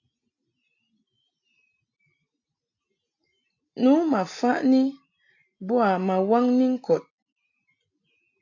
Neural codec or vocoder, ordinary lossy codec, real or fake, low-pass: none; AAC, 48 kbps; real; 7.2 kHz